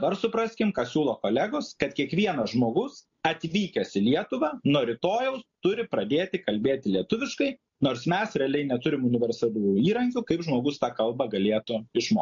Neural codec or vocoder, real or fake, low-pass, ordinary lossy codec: none; real; 7.2 kHz; MP3, 48 kbps